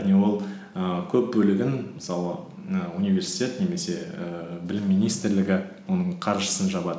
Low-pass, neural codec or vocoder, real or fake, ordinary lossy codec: none; none; real; none